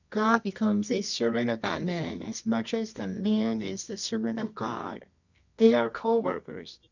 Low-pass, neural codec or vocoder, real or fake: 7.2 kHz; codec, 24 kHz, 0.9 kbps, WavTokenizer, medium music audio release; fake